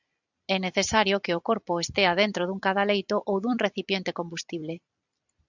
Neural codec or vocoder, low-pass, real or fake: vocoder, 44.1 kHz, 128 mel bands every 256 samples, BigVGAN v2; 7.2 kHz; fake